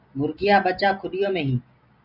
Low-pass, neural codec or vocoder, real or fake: 5.4 kHz; none; real